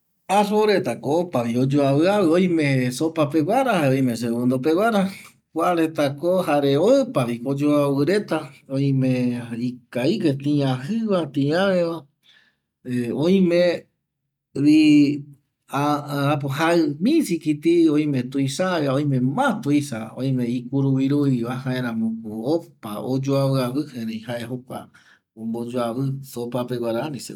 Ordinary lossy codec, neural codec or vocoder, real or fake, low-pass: none; none; real; 19.8 kHz